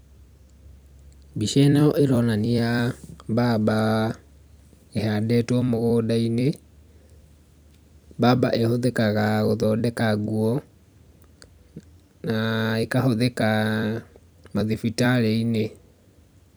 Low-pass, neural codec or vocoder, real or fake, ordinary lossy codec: none; vocoder, 44.1 kHz, 128 mel bands every 256 samples, BigVGAN v2; fake; none